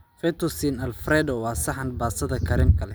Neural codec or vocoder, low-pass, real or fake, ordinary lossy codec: none; none; real; none